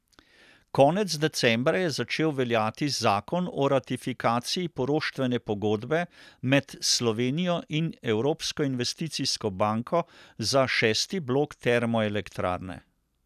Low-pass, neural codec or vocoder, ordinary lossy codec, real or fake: 14.4 kHz; none; none; real